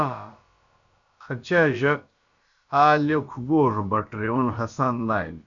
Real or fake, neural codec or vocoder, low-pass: fake; codec, 16 kHz, about 1 kbps, DyCAST, with the encoder's durations; 7.2 kHz